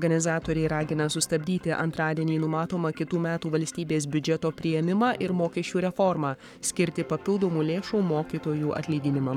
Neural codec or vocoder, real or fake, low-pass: codec, 44.1 kHz, 7.8 kbps, Pupu-Codec; fake; 19.8 kHz